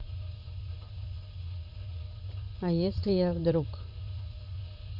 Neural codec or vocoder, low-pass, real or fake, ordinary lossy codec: vocoder, 44.1 kHz, 80 mel bands, Vocos; 5.4 kHz; fake; none